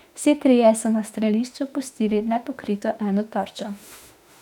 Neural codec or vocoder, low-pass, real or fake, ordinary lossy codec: autoencoder, 48 kHz, 32 numbers a frame, DAC-VAE, trained on Japanese speech; 19.8 kHz; fake; none